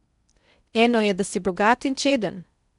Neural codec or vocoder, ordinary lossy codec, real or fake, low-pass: codec, 16 kHz in and 24 kHz out, 0.6 kbps, FocalCodec, streaming, 4096 codes; none; fake; 10.8 kHz